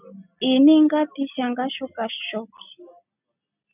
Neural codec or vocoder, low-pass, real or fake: none; 3.6 kHz; real